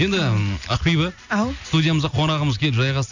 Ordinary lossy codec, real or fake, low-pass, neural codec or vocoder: none; real; 7.2 kHz; none